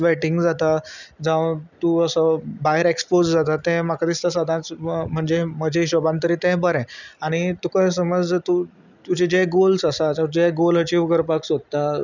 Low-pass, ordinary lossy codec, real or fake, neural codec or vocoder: 7.2 kHz; none; real; none